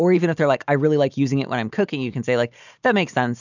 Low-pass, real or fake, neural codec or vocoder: 7.2 kHz; real; none